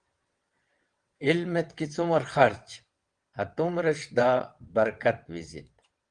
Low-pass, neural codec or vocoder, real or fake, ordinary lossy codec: 9.9 kHz; vocoder, 22.05 kHz, 80 mel bands, WaveNeXt; fake; Opus, 24 kbps